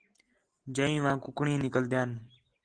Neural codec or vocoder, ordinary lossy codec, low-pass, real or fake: none; Opus, 16 kbps; 9.9 kHz; real